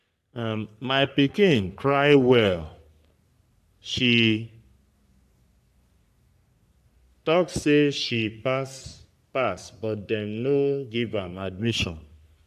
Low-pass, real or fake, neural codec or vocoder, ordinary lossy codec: 14.4 kHz; fake; codec, 44.1 kHz, 3.4 kbps, Pupu-Codec; AAC, 96 kbps